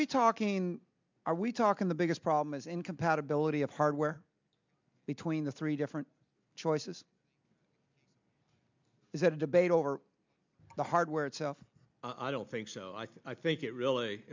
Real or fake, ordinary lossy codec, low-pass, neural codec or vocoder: real; MP3, 64 kbps; 7.2 kHz; none